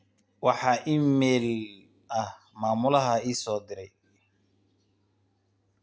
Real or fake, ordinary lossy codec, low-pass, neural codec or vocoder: real; none; none; none